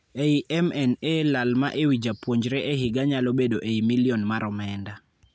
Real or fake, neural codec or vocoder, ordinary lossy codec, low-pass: real; none; none; none